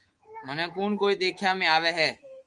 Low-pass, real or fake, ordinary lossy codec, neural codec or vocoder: 10.8 kHz; fake; Opus, 24 kbps; codec, 24 kHz, 3.1 kbps, DualCodec